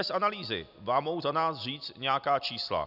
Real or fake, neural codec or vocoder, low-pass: real; none; 5.4 kHz